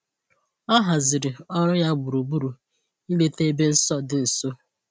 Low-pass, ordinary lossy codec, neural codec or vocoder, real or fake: none; none; none; real